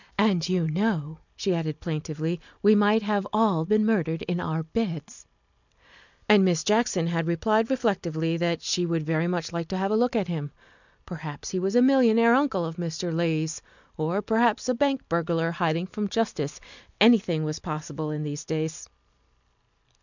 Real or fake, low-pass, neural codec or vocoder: real; 7.2 kHz; none